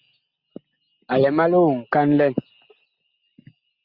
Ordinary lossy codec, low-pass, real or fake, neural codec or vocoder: Opus, 64 kbps; 5.4 kHz; real; none